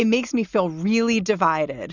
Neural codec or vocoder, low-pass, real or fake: none; 7.2 kHz; real